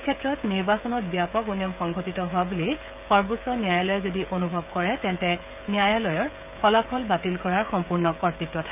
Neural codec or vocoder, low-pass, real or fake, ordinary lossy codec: codec, 16 kHz, 16 kbps, FreqCodec, smaller model; 3.6 kHz; fake; none